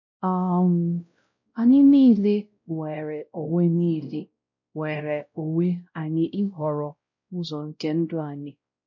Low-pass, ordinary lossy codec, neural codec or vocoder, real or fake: 7.2 kHz; MP3, 48 kbps; codec, 16 kHz, 0.5 kbps, X-Codec, WavLM features, trained on Multilingual LibriSpeech; fake